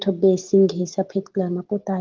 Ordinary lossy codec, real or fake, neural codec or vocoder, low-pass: Opus, 16 kbps; real; none; 7.2 kHz